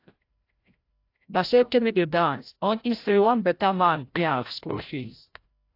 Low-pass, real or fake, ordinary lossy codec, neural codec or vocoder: 5.4 kHz; fake; AAC, 32 kbps; codec, 16 kHz, 0.5 kbps, FreqCodec, larger model